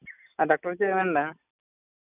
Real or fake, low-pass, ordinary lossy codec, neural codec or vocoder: real; 3.6 kHz; none; none